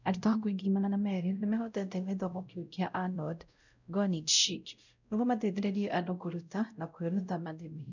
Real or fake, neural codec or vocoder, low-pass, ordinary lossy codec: fake; codec, 16 kHz, 0.5 kbps, X-Codec, WavLM features, trained on Multilingual LibriSpeech; 7.2 kHz; none